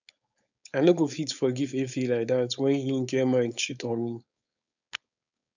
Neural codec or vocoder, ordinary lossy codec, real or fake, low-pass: codec, 16 kHz, 4.8 kbps, FACodec; none; fake; 7.2 kHz